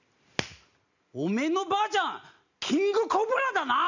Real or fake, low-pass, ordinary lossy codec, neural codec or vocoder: real; 7.2 kHz; none; none